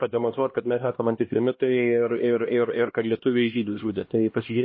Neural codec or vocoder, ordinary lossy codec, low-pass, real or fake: codec, 16 kHz, 1 kbps, X-Codec, HuBERT features, trained on LibriSpeech; MP3, 24 kbps; 7.2 kHz; fake